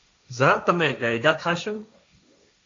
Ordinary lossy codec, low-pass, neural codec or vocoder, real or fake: AAC, 48 kbps; 7.2 kHz; codec, 16 kHz, 1.1 kbps, Voila-Tokenizer; fake